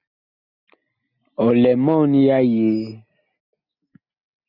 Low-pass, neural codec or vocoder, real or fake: 5.4 kHz; none; real